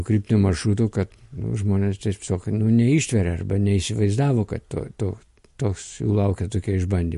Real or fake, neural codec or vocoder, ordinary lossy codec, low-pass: real; none; MP3, 48 kbps; 14.4 kHz